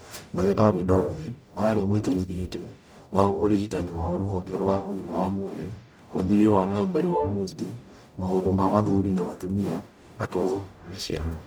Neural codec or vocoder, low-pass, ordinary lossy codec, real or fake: codec, 44.1 kHz, 0.9 kbps, DAC; none; none; fake